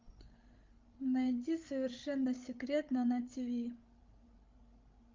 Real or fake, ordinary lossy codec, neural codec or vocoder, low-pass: fake; Opus, 24 kbps; codec, 16 kHz, 16 kbps, FreqCodec, larger model; 7.2 kHz